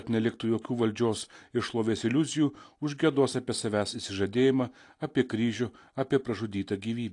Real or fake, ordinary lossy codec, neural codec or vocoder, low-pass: real; AAC, 48 kbps; none; 10.8 kHz